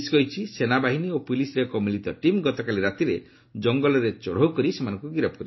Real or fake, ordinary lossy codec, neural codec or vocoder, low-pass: real; MP3, 24 kbps; none; 7.2 kHz